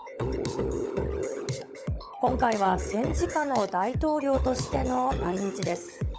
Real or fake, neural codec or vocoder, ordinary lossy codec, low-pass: fake; codec, 16 kHz, 16 kbps, FunCodec, trained on LibriTTS, 50 frames a second; none; none